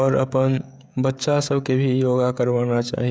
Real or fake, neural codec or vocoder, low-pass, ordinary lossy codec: fake; codec, 16 kHz, 16 kbps, FreqCodec, smaller model; none; none